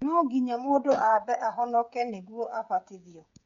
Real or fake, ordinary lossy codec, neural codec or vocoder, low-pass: fake; MP3, 64 kbps; codec, 16 kHz, 16 kbps, FreqCodec, smaller model; 7.2 kHz